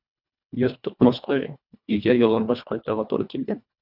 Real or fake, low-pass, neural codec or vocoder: fake; 5.4 kHz; codec, 24 kHz, 1.5 kbps, HILCodec